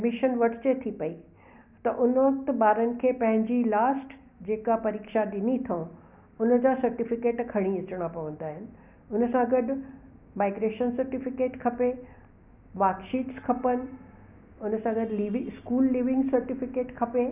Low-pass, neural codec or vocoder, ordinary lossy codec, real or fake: 3.6 kHz; none; none; real